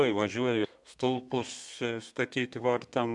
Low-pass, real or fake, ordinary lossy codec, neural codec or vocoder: 10.8 kHz; fake; MP3, 96 kbps; codec, 32 kHz, 1.9 kbps, SNAC